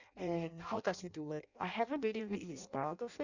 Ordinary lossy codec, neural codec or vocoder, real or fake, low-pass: none; codec, 16 kHz in and 24 kHz out, 0.6 kbps, FireRedTTS-2 codec; fake; 7.2 kHz